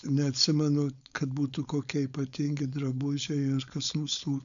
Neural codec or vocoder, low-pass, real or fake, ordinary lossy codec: codec, 16 kHz, 4.8 kbps, FACodec; 7.2 kHz; fake; MP3, 96 kbps